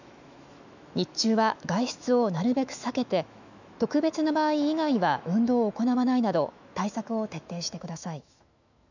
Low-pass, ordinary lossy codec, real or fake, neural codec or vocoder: 7.2 kHz; none; fake; autoencoder, 48 kHz, 128 numbers a frame, DAC-VAE, trained on Japanese speech